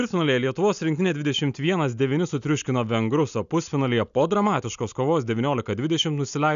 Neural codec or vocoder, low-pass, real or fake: none; 7.2 kHz; real